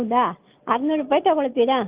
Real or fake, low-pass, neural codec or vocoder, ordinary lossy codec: real; 3.6 kHz; none; Opus, 32 kbps